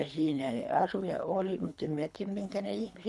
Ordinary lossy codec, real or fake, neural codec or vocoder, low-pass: none; fake; codec, 24 kHz, 3 kbps, HILCodec; none